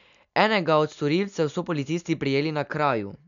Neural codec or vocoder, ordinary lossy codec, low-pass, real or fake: none; none; 7.2 kHz; real